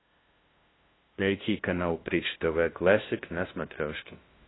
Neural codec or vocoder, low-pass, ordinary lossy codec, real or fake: codec, 16 kHz, 0.5 kbps, FunCodec, trained on LibriTTS, 25 frames a second; 7.2 kHz; AAC, 16 kbps; fake